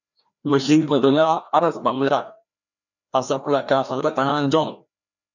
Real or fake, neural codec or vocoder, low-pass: fake; codec, 16 kHz, 1 kbps, FreqCodec, larger model; 7.2 kHz